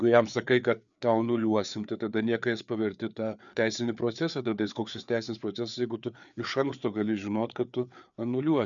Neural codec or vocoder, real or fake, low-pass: codec, 16 kHz, 4 kbps, FreqCodec, larger model; fake; 7.2 kHz